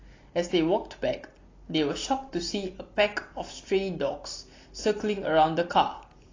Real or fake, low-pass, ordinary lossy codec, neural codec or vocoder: real; 7.2 kHz; AAC, 32 kbps; none